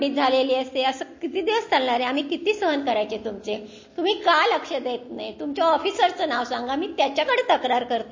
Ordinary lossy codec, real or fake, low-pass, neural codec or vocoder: MP3, 32 kbps; real; 7.2 kHz; none